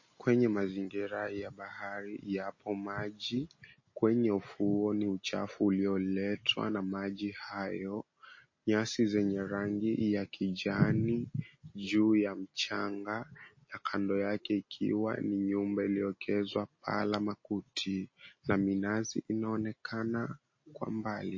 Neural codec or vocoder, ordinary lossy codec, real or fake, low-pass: none; MP3, 32 kbps; real; 7.2 kHz